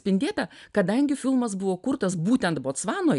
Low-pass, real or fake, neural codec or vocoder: 10.8 kHz; real; none